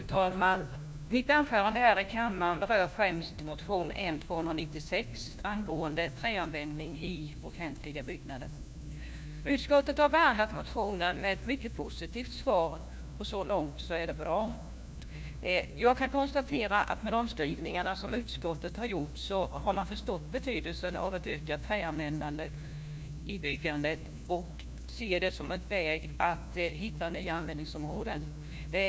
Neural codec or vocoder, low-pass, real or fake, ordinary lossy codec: codec, 16 kHz, 1 kbps, FunCodec, trained on LibriTTS, 50 frames a second; none; fake; none